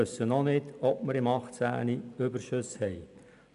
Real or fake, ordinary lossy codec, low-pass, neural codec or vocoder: real; none; 10.8 kHz; none